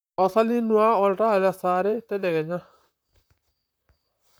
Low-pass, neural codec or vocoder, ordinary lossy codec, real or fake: none; vocoder, 44.1 kHz, 128 mel bands, Pupu-Vocoder; none; fake